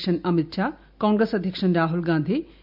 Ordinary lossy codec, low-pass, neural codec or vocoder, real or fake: none; 5.4 kHz; none; real